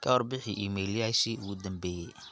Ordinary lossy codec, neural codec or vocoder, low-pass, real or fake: none; none; none; real